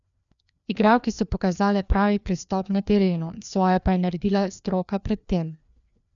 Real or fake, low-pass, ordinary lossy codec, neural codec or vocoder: fake; 7.2 kHz; none; codec, 16 kHz, 2 kbps, FreqCodec, larger model